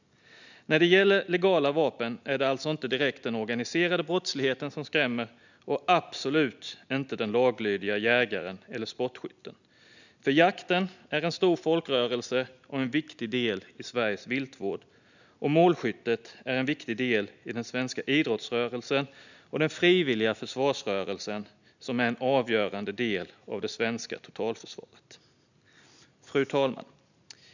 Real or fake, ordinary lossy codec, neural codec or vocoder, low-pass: real; none; none; 7.2 kHz